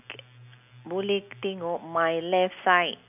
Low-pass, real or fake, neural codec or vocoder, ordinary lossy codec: 3.6 kHz; real; none; none